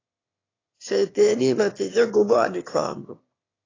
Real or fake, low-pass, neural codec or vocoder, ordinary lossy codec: fake; 7.2 kHz; autoencoder, 22.05 kHz, a latent of 192 numbers a frame, VITS, trained on one speaker; AAC, 32 kbps